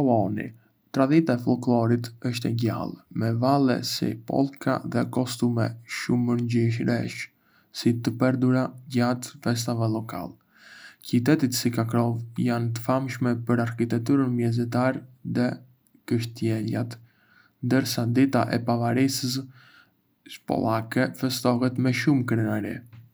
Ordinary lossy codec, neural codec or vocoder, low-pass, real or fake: none; none; none; real